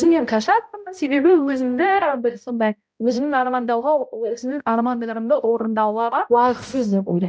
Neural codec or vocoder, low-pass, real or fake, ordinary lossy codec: codec, 16 kHz, 0.5 kbps, X-Codec, HuBERT features, trained on balanced general audio; none; fake; none